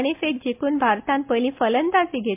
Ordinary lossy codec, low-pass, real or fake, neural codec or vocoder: MP3, 32 kbps; 3.6 kHz; real; none